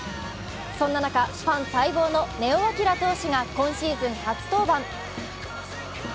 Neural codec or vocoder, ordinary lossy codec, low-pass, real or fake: none; none; none; real